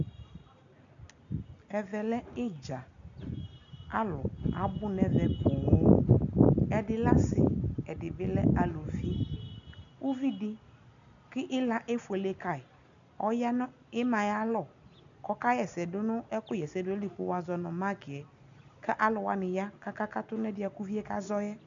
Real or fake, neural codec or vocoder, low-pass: real; none; 7.2 kHz